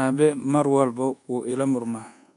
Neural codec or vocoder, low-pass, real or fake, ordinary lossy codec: codec, 24 kHz, 1.2 kbps, DualCodec; 10.8 kHz; fake; none